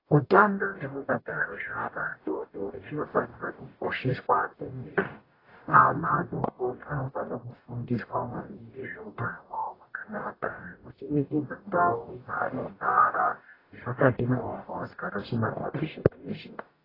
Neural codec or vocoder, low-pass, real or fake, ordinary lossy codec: codec, 44.1 kHz, 0.9 kbps, DAC; 5.4 kHz; fake; AAC, 24 kbps